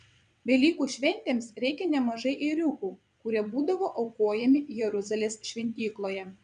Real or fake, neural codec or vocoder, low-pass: fake; vocoder, 22.05 kHz, 80 mel bands, WaveNeXt; 9.9 kHz